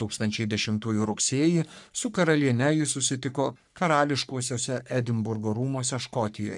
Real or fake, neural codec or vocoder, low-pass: fake; codec, 44.1 kHz, 3.4 kbps, Pupu-Codec; 10.8 kHz